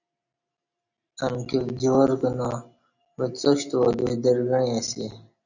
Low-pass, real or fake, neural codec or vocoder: 7.2 kHz; real; none